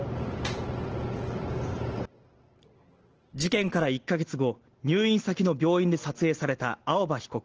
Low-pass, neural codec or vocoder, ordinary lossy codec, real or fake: 7.2 kHz; none; Opus, 16 kbps; real